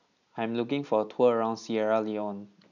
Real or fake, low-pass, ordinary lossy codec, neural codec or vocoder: real; 7.2 kHz; none; none